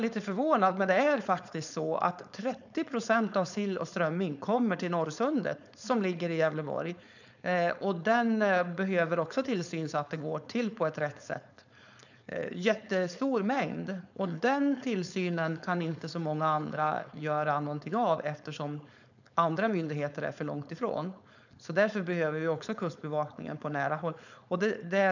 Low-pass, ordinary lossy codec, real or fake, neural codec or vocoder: 7.2 kHz; none; fake; codec, 16 kHz, 4.8 kbps, FACodec